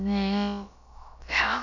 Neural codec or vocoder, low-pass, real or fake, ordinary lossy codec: codec, 16 kHz, about 1 kbps, DyCAST, with the encoder's durations; 7.2 kHz; fake; none